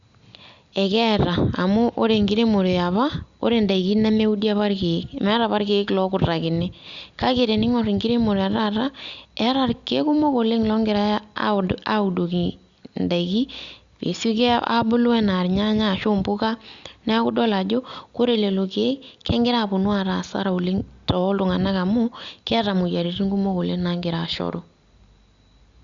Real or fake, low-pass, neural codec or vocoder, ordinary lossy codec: real; 7.2 kHz; none; Opus, 64 kbps